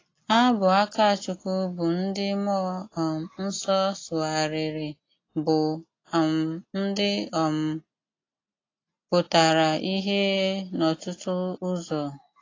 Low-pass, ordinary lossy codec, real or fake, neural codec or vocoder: 7.2 kHz; AAC, 32 kbps; real; none